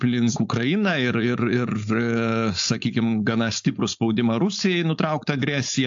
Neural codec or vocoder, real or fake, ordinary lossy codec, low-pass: codec, 16 kHz, 4.8 kbps, FACodec; fake; AAC, 64 kbps; 7.2 kHz